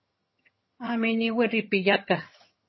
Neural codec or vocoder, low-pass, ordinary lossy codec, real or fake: vocoder, 22.05 kHz, 80 mel bands, HiFi-GAN; 7.2 kHz; MP3, 24 kbps; fake